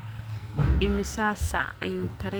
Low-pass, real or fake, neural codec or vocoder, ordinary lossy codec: none; fake; codec, 44.1 kHz, 2.6 kbps, SNAC; none